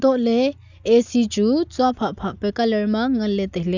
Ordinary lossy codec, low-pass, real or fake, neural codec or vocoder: none; 7.2 kHz; real; none